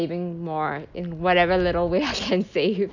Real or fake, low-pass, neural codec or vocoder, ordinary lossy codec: real; 7.2 kHz; none; none